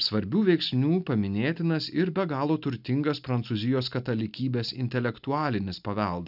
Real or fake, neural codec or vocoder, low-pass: real; none; 5.4 kHz